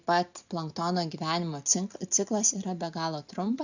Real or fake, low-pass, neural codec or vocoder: real; 7.2 kHz; none